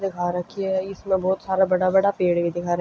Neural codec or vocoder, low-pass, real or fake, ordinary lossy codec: none; none; real; none